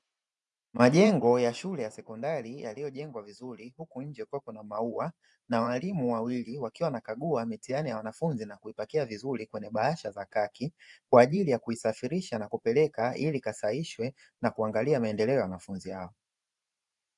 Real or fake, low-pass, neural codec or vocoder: fake; 10.8 kHz; vocoder, 44.1 kHz, 128 mel bands every 512 samples, BigVGAN v2